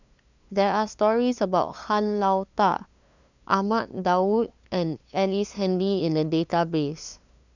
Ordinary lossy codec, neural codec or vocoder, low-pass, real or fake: none; codec, 16 kHz, 2 kbps, FunCodec, trained on LibriTTS, 25 frames a second; 7.2 kHz; fake